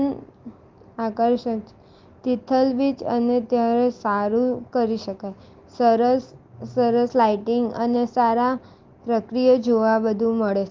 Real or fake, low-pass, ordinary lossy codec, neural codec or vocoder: real; 7.2 kHz; Opus, 32 kbps; none